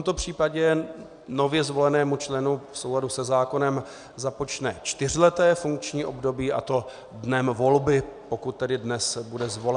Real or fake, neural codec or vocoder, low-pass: real; none; 9.9 kHz